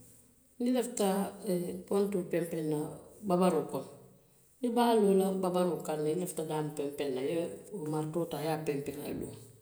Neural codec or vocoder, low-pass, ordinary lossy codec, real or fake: vocoder, 48 kHz, 128 mel bands, Vocos; none; none; fake